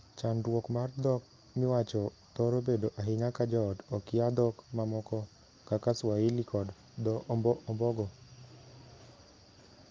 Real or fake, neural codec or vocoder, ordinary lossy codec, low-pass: real; none; Opus, 24 kbps; 7.2 kHz